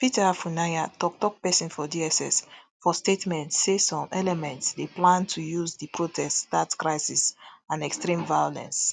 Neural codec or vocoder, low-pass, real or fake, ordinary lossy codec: none; none; real; none